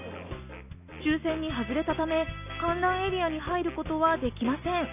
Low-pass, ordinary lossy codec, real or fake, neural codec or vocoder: 3.6 kHz; none; real; none